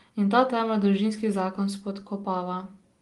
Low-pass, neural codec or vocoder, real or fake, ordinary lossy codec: 10.8 kHz; none; real; Opus, 24 kbps